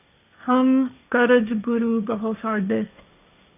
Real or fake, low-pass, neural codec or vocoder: fake; 3.6 kHz; codec, 16 kHz, 1.1 kbps, Voila-Tokenizer